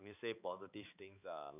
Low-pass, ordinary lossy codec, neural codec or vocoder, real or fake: 3.6 kHz; none; codec, 16 kHz, 4 kbps, X-Codec, HuBERT features, trained on balanced general audio; fake